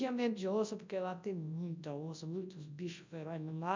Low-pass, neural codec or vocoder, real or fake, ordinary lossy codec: 7.2 kHz; codec, 24 kHz, 0.9 kbps, WavTokenizer, large speech release; fake; MP3, 64 kbps